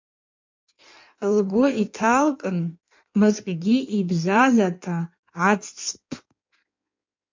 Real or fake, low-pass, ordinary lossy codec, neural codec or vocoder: fake; 7.2 kHz; MP3, 64 kbps; codec, 16 kHz in and 24 kHz out, 1.1 kbps, FireRedTTS-2 codec